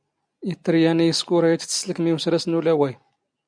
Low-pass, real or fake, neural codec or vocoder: 9.9 kHz; real; none